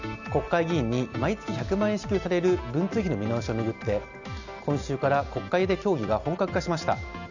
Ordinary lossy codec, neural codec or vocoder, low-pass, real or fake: none; none; 7.2 kHz; real